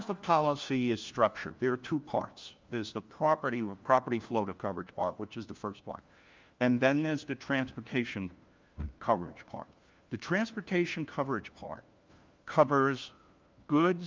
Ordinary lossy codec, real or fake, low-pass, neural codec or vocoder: Opus, 32 kbps; fake; 7.2 kHz; codec, 16 kHz, 1 kbps, FunCodec, trained on LibriTTS, 50 frames a second